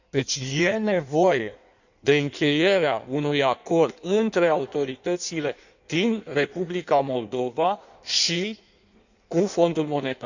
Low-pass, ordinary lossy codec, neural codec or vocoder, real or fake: 7.2 kHz; none; codec, 16 kHz in and 24 kHz out, 1.1 kbps, FireRedTTS-2 codec; fake